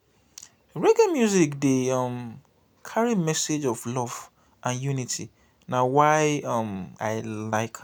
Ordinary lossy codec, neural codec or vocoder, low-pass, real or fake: none; none; none; real